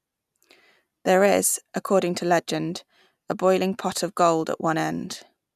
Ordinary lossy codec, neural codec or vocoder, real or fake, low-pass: none; none; real; 14.4 kHz